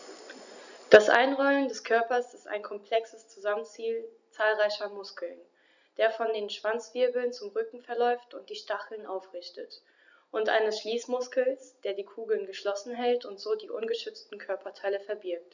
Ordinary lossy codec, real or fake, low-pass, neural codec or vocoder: none; real; 7.2 kHz; none